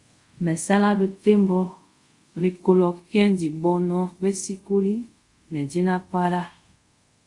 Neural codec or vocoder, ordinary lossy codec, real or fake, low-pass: codec, 24 kHz, 0.5 kbps, DualCodec; Opus, 64 kbps; fake; 10.8 kHz